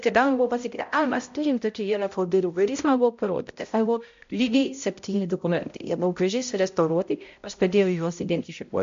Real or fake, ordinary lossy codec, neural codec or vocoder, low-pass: fake; MP3, 48 kbps; codec, 16 kHz, 0.5 kbps, X-Codec, HuBERT features, trained on balanced general audio; 7.2 kHz